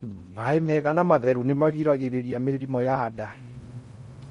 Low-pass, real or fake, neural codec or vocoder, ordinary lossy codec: 10.8 kHz; fake; codec, 16 kHz in and 24 kHz out, 0.6 kbps, FocalCodec, streaming, 4096 codes; MP3, 48 kbps